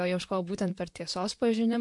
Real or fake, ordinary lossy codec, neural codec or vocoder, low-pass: fake; MP3, 64 kbps; vocoder, 44.1 kHz, 128 mel bands, Pupu-Vocoder; 10.8 kHz